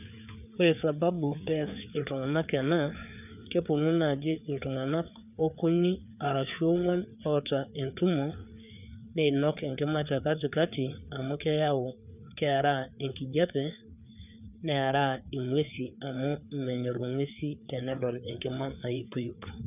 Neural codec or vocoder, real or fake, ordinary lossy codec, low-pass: codec, 16 kHz, 4 kbps, FreqCodec, larger model; fake; none; 3.6 kHz